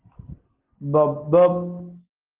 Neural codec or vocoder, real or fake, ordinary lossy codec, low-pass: none; real; Opus, 16 kbps; 3.6 kHz